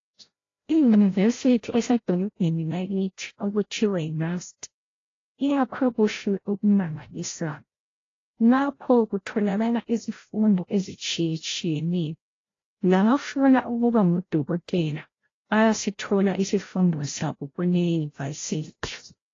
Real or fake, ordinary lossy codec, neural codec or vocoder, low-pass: fake; AAC, 32 kbps; codec, 16 kHz, 0.5 kbps, FreqCodec, larger model; 7.2 kHz